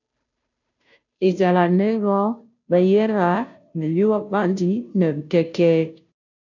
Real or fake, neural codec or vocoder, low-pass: fake; codec, 16 kHz, 0.5 kbps, FunCodec, trained on Chinese and English, 25 frames a second; 7.2 kHz